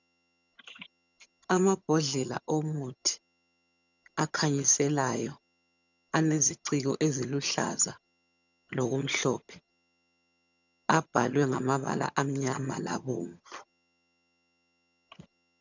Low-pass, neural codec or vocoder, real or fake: 7.2 kHz; vocoder, 22.05 kHz, 80 mel bands, HiFi-GAN; fake